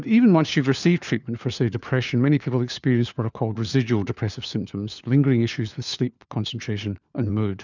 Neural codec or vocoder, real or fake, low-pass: codec, 16 kHz, 4 kbps, FunCodec, trained on LibriTTS, 50 frames a second; fake; 7.2 kHz